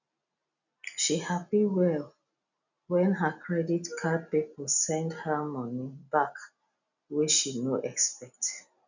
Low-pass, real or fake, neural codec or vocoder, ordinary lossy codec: 7.2 kHz; real; none; none